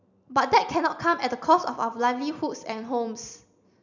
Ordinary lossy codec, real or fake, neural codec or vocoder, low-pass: none; real; none; 7.2 kHz